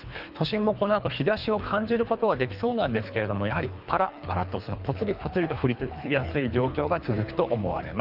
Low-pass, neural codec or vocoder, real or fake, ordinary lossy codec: 5.4 kHz; codec, 24 kHz, 3 kbps, HILCodec; fake; Opus, 64 kbps